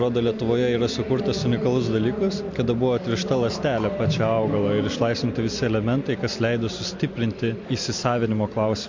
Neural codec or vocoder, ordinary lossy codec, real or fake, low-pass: none; MP3, 48 kbps; real; 7.2 kHz